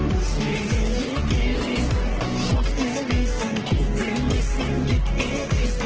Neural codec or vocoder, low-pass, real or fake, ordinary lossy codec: codec, 44.1 kHz, 3.4 kbps, Pupu-Codec; 7.2 kHz; fake; Opus, 16 kbps